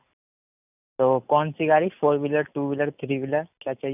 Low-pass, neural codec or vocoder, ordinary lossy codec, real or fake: 3.6 kHz; none; none; real